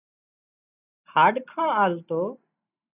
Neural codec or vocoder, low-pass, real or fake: none; 3.6 kHz; real